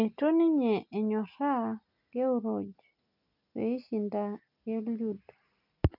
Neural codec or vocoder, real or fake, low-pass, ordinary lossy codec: none; real; 5.4 kHz; none